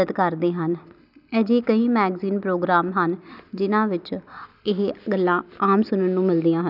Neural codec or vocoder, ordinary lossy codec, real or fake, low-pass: none; none; real; 5.4 kHz